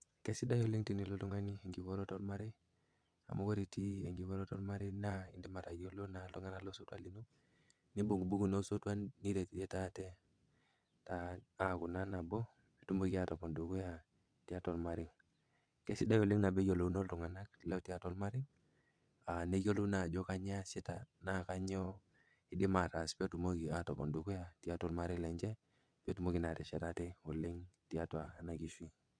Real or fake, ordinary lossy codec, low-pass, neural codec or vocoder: fake; none; 9.9 kHz; vocoder, 22.05 kHz, 80 mel bands, WaveNeXt